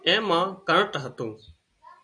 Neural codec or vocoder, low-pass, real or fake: none; 9.9 kHz; real